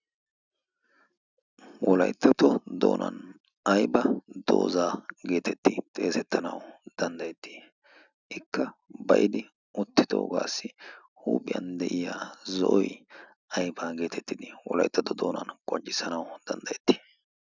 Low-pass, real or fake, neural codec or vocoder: 7.2 kHz; real; none